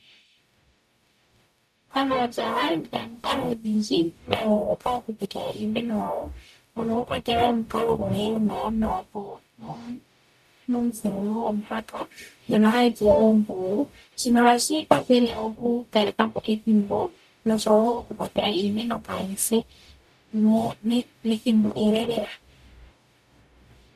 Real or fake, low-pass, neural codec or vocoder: fake; 14.4 kHz; codec, 44.1 kHz, 0.9 kbps, DAC